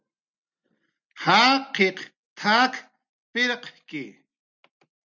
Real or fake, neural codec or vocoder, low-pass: real; none; 7.2 kHz